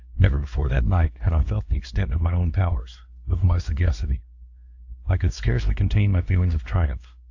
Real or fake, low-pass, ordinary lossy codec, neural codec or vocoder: fake; 7.2 kHz; AAC, 48 kbps; codec, 16 kHz, 2 kbps, X-Codec, WavLM features, trained on Multilingual LibriSpeech